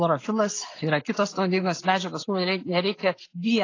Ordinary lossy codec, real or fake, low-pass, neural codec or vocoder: AAC, 32 kbps; real; 7.2 kHz; none